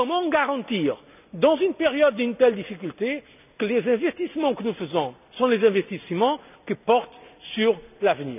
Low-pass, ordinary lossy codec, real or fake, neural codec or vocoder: 3.6 kHz; none; real; none